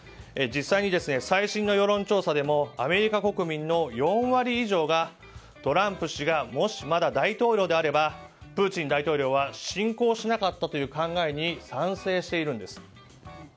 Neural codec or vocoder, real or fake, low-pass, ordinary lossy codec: none; real; none; none